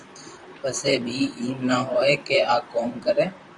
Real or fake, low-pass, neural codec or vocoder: fake; 10.8 kHz; vocoder, 44.1 kHz, 128 mel bands, Pupu-Vocoder